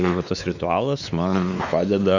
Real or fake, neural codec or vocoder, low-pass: fake; codec, 16 kHz, 4 kbps, X-Codec, WavLM features, trained on Multilingual LibriSpeech; 7.2 kHz